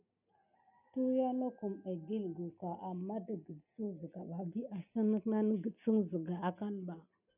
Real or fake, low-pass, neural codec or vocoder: real; 3.6 kHz; none